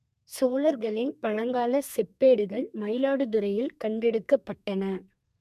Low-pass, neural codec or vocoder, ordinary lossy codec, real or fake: 14.4 kHz; codec, 32 kHz, 1.9 kbps, SNAC; MP3, 96 kbps; fake